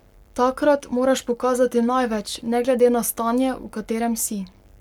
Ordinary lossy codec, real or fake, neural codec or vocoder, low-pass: none; fake; codec, 44.1 kHz, 7.8 kbps, Pupu-Codec; 19.8 kHz